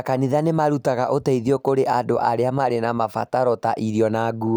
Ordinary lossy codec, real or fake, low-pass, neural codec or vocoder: none; real; none; none